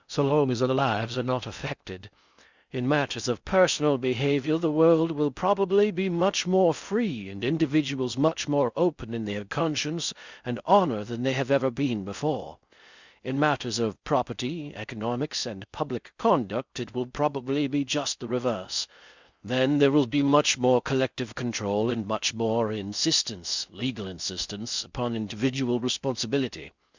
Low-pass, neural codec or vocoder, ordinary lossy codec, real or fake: 7.2 kHz; codec, 16 kHz in and 24 kHz out, 0.6 kbps, FocalCodec, streaming, 2048 codes; Opus, 64 kbps; fake